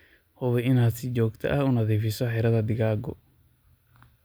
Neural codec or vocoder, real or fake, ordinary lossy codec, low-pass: none; real; none; none